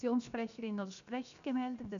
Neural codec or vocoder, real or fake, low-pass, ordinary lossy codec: codec, 16 kHz, 0.7 kbps, FocalCodec; fake; 7.2 kHz; none